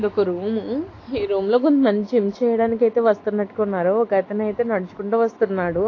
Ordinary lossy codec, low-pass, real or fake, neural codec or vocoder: AAC, 32 kbps; 7.2 kHz; real; none